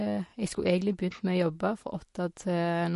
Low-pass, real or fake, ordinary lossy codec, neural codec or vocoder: 14.4 kHz; real; MP3, 48 kbps; none